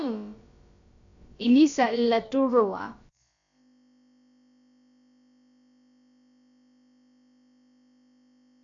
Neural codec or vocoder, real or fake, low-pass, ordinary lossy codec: codec, 16 kHz, about 1 kbps, DyCAST, with the encoder's durations; fake; 7.2 kHz; MP3, 96 kbps